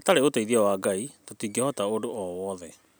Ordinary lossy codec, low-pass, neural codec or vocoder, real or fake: none; none; none; real